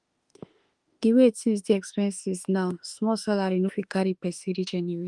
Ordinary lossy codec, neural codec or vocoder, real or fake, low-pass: Opus, 24 kbps; autoencoder, 48 kHz, 32 numbers a frame, DAC-VAE, trained on Japanese speech; fake; 10.8 kHz